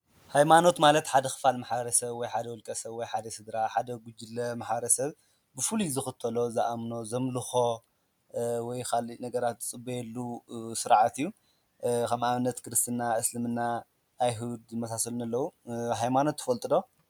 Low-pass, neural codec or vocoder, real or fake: 19.8 kHz; none; real